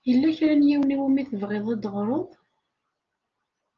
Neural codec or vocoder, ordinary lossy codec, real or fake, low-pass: none; Opus, 24 kbps; real; 7.2 kHz